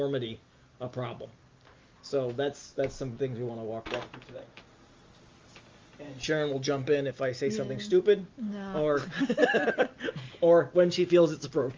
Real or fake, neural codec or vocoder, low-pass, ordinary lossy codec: real; none; 7.2 kHz; Opus, 32 kbps